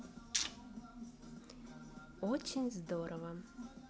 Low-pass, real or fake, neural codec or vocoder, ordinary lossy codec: none; real; none; none